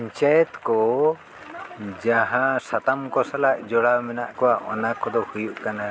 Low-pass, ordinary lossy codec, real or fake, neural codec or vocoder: none; none; real; none